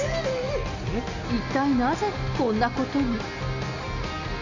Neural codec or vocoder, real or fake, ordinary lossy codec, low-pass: none; real; none; 7.2 kHz